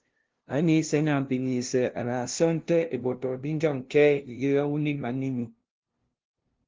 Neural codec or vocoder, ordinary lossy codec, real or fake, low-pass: codec, 16 kHz, 0.5 kbps, FunCodec, trained on LibriTTS, 25 frames a second; Opus, 16 kbps; fake; 7.2 kHz